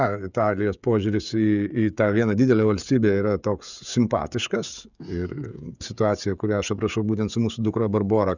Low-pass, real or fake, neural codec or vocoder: 7.2 kHz; fake; codec, 16 kHz, 16 kbps, FreqCodec, smaller model